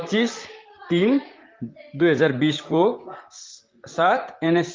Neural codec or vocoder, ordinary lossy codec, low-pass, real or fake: vocoder, 44.1 kHz, 128 mel bands, Pupu-Vocoder; Opus, 32 kbps; 7.2 kHz; fake